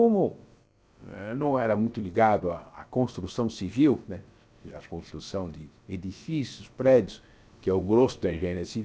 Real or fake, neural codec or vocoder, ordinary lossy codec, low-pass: fake; codec, 16 kHz, about 1 kbps, DyCAST, with the encoder's durations; none; none